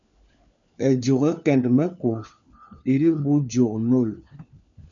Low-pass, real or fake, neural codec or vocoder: 7.2 kHz; fake; codec, 16 kHz, 4 kbps, FunCodec, trained on LibriTTS, 50 frames a second